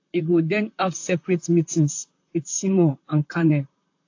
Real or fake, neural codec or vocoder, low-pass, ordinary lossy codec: fake; vocoder, 44.1 kHz, 128 mel bands, Pupu-Vocoder; 7.2 kHz; AAC, 48 kbps